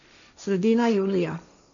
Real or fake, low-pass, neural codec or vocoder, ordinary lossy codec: fake; 7.2 kHz; codec, 16 kHz, 1.1 kbps, Voila-Tokenizer; none